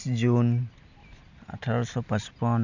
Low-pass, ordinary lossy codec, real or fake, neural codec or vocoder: 7.2 kHz; none; real; none